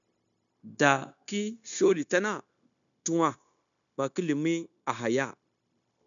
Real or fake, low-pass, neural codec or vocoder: fake; 7.2 kHz; codec, 16 kHz, 0.9 kbps, LongCat-Audio-Codec